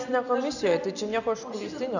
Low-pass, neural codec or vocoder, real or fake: 7.2 kHz; none; real